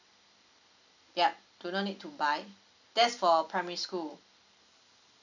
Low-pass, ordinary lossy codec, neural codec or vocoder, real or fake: 7.2 kHz; none; none; real